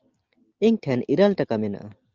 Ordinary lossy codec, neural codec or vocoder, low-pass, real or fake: Opus, 24 kbps; none; 7.2 kHz; real